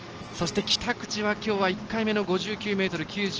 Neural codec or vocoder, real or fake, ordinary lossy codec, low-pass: none; real; Opus, 16 kbps; 7.2 kHz